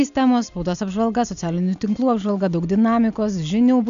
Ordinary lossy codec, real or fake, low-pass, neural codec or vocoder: AAC, 96 kbps; real; 7.2 kHz; none